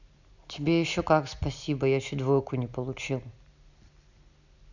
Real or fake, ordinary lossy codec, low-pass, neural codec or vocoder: real; none; 7.2 kHz; none